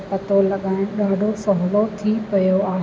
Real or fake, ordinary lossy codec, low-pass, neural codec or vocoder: real; none; none; none